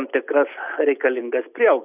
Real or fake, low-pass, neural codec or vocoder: real; 3.6 kHz; none